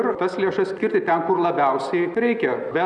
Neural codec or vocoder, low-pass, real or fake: none; 10.8 kHz; real